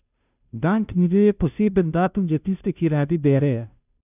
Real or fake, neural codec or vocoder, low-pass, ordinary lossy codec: fake; codec, 16 kHz, 0.5 kbps, FunCodec, trained on Chinese and English, 25 frames a second; 3.6 kHz; none